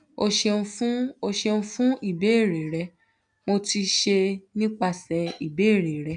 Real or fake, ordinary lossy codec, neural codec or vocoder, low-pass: real; none; none; 9.9 kHz